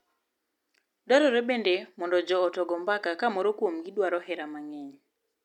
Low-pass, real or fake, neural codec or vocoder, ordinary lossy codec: 19.8 kHz; real; none; none